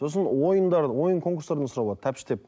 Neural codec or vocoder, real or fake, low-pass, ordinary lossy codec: none; real; none; none